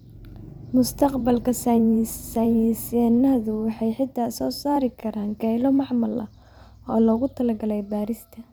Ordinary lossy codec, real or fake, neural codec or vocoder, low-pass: none; fake; vocoder, 44.1 kHz, 128 mel bands every 512 samples, BigVGAN v2; none